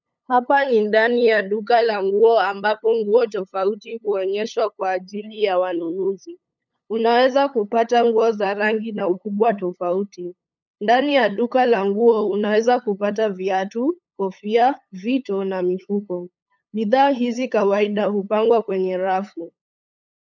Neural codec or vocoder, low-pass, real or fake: codec, 16 kHz, 8 kbps, FunCodec, trained on LibriTTS, 25 frames a second; 7.2 kHz; fake